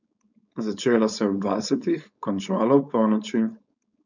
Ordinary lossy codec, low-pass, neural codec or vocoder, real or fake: none; 7.2 kHz; codec, 16 kHz, 4.8 kbps, FACodec; fake